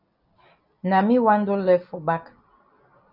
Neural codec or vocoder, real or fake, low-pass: none; real; 5.4 kHz